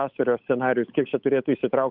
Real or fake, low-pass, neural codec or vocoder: fake; 5.4 kHz; codec, 16 kHz, 8 kbps, FunCodec, trained on Chinese and English, 25 frames a second